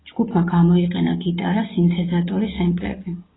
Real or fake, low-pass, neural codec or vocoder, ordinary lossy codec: real; 7.2 kHz; none; AAC, 16 kbps